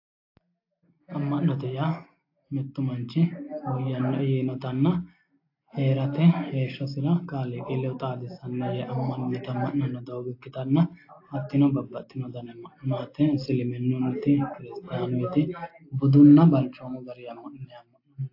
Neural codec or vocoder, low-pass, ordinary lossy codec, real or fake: none; 5.4 kHz; AAC, 32 kbps; real